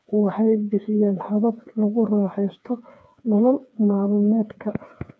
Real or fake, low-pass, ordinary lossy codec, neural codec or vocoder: fake; none; none; codec, 16 kHz, 4 kbps, FreqCodec, smaller model